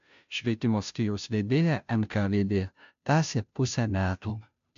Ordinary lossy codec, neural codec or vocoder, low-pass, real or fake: AAC, 64 kbps; codec, 16 kHz, 0.5 kbps, FunCodec, trained on Chinese and English, 25 frames a second; 7.2 kHz; fake